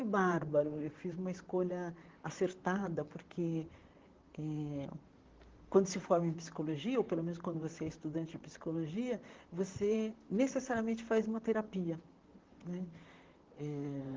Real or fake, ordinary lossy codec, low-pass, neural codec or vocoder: fake; Opus, 16 kbps; 7.2 kHz; vocoder, 44.1 kHz, 128 mel bands, Pupu-Vocoder